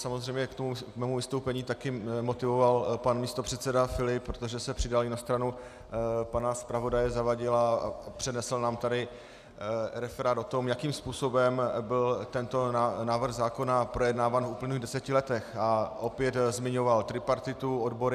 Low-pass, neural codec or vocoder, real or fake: 14.4 kHz; none; real